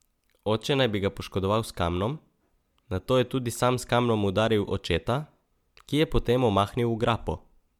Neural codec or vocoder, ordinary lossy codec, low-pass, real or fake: none; MP3, 96 kbps; 19.8 kHz; real